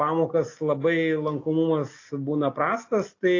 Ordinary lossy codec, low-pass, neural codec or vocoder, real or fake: AAC, 32 kbps; 7.2 kHz; none; real